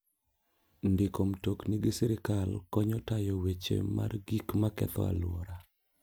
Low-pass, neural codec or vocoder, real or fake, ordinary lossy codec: none; none; real; none